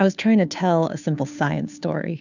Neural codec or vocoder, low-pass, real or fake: codec, 16 kHz, 2 kbps, FunCodec, trained on Chinese and English, 25 frames a second; 7.2 kHz; fake